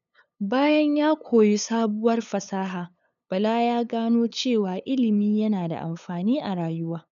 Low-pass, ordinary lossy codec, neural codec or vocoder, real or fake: 7.2 kHz; none; codec, 16 kHz, 8 kbps, FunCodec, trained on LibriTTS, 25 frames a second; fake